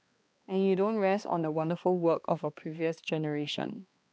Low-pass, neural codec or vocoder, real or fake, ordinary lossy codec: none; codec, 16 kHz, 2 kbps, X-Codec, HuBERT features, trained on balanced general audio; fake; none